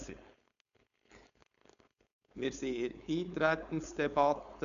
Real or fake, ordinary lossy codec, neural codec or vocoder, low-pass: fake; none; codec, 16 kHz, 4.8 kbps, FACodec; 7.2 kHz